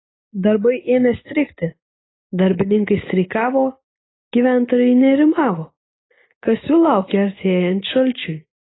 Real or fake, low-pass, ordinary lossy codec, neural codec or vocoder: real; 7.2 kHz; AAC, 16 kbps; none